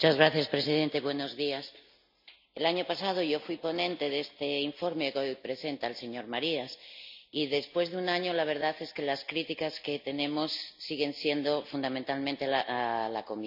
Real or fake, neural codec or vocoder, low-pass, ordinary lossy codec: real; none; 5.4 kHz; MP3, 48 kbps